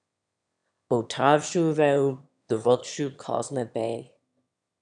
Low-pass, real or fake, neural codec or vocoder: 9.9 kHz; fake; autoencoder, 22.05 kHz, a latent of 192 numbers a frame, VITS, trained on one speaker